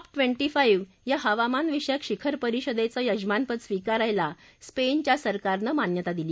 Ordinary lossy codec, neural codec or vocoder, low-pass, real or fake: none; none; none; real